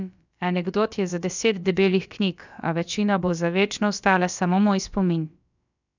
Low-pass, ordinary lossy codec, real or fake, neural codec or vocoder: 7.2 kHz; none; fake; codec, 16 kHz, about 1 kbps, DyCAST, with the encoder's durations